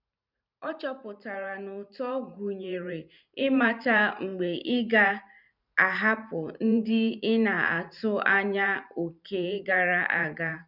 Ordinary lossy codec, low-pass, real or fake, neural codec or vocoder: none; 5.4 kHz; fake; vocoder, 44.1 kHz, 128 mel bands every 512 samples, BigVGAN v2